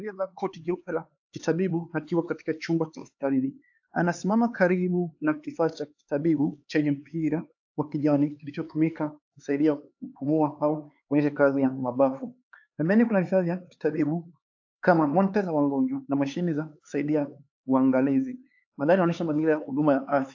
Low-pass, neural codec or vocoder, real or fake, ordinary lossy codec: 7.2 kHz; codec, 16 kHz, 4 kbps, X-Codec, HuBERT features, trained on LibriSpeech; fake; AAC, 48 kbps